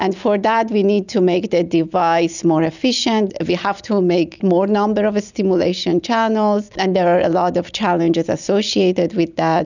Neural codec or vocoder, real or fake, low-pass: none; real; 7.2 kHz